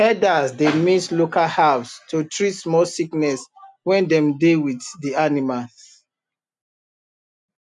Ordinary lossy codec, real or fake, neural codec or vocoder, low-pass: none; real; none; 10.8 kHz